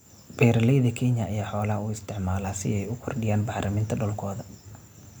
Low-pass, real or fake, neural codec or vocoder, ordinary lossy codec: none; real; none; none